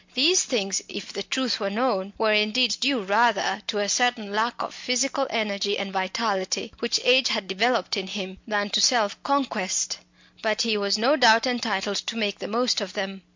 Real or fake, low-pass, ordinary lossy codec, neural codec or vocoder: real; 7.2 kHz; MP3, 48 kbps; none